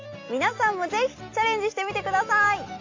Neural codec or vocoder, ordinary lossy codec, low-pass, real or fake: none; AAC, 48 kbps; 7.2 kHz; real